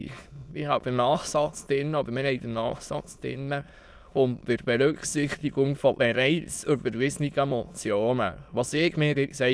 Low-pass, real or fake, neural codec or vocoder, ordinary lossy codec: none; fake; autoencoder, 22.05 kHz, a latent of 192 numbers a frame, VITS, trained on many speakers; none